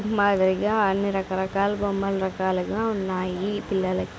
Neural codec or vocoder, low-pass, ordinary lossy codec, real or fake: none; none; none; real